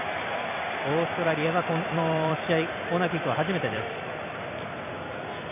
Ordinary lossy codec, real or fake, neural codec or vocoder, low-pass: MP3, 32 kbps; fake; vocoder, 44.1 kHz, 128 mel bands every 256 samples, BigVGAN v2; 3.6 kHz